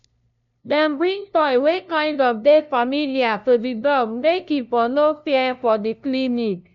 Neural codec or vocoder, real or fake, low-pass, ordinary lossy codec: codec, 16 kHz, 0.5 kbps, FunCodec, trained on LibriTTS, 25 frames a second; fake; 7.2 kHz; none